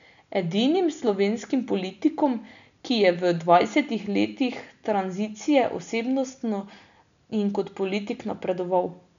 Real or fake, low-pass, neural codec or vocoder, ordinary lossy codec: real; 7.2 kHz; none; none